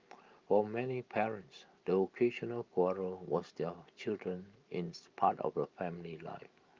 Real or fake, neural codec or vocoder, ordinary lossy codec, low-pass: fake; vocoder, 44.1 kHz, 128 mel bands every 512 samples, BigVGAN v2; Opus, 24 kbps; 7.2 kHz